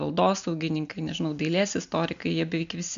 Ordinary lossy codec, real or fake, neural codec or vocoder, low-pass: AAC, 96 kbps; real; none; 7.2 kHz